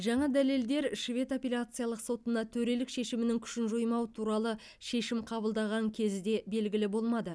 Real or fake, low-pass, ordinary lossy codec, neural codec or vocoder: real; none; none; none